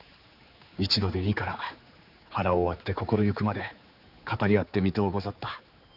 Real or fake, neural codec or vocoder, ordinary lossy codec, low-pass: fake; codec, 16 kHz, 4 kbps, X-Codec, HuBERT features, trained on general audio; none; 5.4 kHz